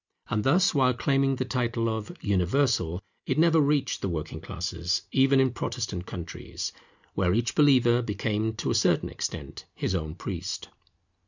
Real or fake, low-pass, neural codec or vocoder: real; 7.2 kHz; none